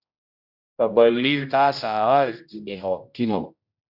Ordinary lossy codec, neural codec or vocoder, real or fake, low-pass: Opus, 64 kbps; codec, 16 kHz, 0.5 kbps, X-Codec, HuBERT features, trained on general audio; fake; 5.4 kHz